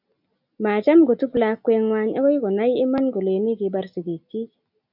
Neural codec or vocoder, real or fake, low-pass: none; real; 5.4 kHz